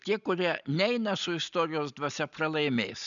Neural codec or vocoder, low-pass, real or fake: none; 7.2 kHz; real